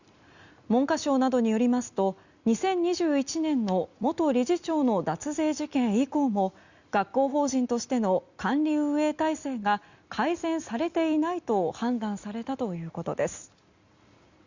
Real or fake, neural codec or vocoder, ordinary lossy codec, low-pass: real; none; Opus, 64 kbps; 7.2 kHz